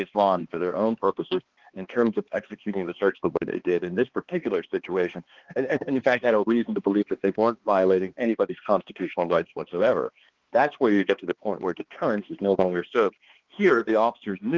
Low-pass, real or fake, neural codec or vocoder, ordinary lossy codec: 7.2 kHz; fake; codec, 16 kHz, 2 kbps, X-Codec, HuBERT features, trained on balanced general audio; Opus, 16 kbps